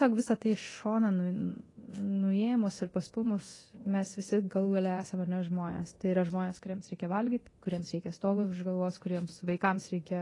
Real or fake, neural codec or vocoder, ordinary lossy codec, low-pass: fake; codec, 24 kHz, 0.9 kbps, DualCodec; AAC, 32 kbps; 10.8 kHz